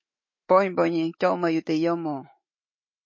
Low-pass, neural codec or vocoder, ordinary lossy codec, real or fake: 7.2 kHz; autoencoder, 48 kHz, 128 numbers a frame, DAC-VAE, trained on Japanese speech; MP3, 32 kbps; fake